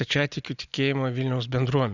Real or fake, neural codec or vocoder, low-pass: real; none; 7.2 kHz